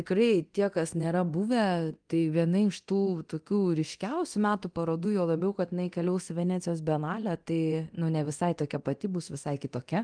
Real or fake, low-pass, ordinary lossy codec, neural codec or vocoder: fake; 9.9 kHz; Opus, 24 kbps; codec, 24 kHz, 0.9 kbps, DualCodec